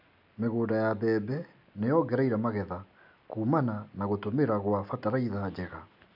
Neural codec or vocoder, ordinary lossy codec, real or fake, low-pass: none; none; real; 5.4 kHz